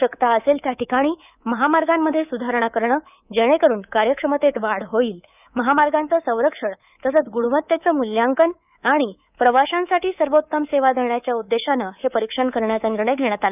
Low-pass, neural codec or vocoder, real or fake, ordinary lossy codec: 3.6 kHz; codec, 24 kHz, 3.1 kbps, DualCodec; fake; none